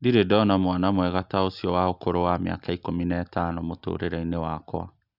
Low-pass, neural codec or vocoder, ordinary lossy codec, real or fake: 5.4 kHz; none; Opus, 64 kbps; real